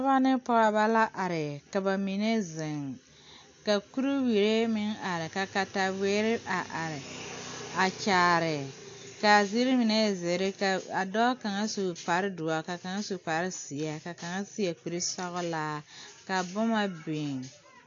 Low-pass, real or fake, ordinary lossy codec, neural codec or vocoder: 7.2 kHz; real; AAC, 48 kbps; none